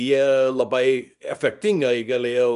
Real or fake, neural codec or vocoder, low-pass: fake; codec, 24 kHz, 0.9 kbps, WavTokenizer, small release; 10.8 kHz